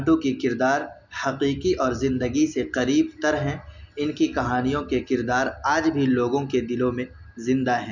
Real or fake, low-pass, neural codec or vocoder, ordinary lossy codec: real; 7.2 kHz; none; none